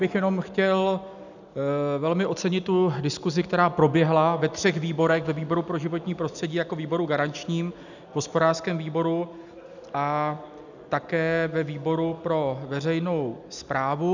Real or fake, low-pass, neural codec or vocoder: real; 7.2 kHz; none